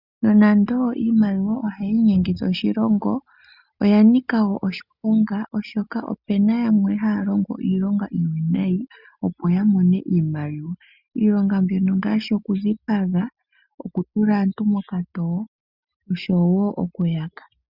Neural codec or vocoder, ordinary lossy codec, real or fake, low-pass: none; Opus, 64 kbps; real; 5.4 kHz